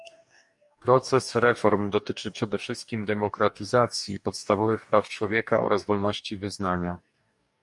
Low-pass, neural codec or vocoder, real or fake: 10.8 kHz; codec, 44.1 kHz, 2.6 kbps, DAC; fake